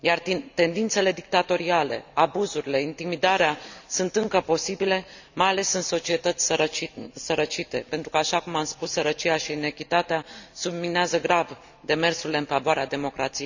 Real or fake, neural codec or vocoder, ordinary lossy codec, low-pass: real; none; none; 7.2 kHz